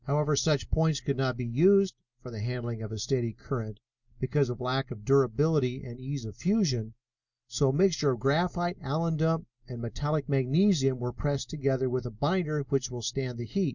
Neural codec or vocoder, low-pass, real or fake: none; 7.2 kHz; real